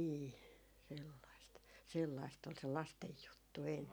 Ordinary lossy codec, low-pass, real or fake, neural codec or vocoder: none; none; real; none